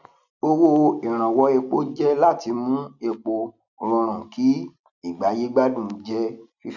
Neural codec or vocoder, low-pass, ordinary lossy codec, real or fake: none; 7.2 kHz; none; real